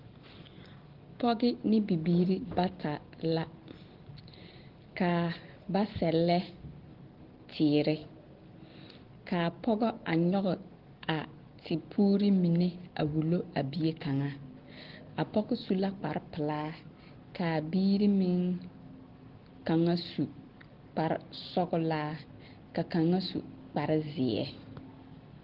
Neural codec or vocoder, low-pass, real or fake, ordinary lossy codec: none; 5.4 kHz; real; Opus, 16 kbps